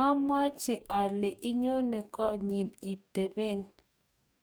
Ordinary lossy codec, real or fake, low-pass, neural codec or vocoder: none; fake; none; codec, 44.1 kHz, 2.6 kbps, DAC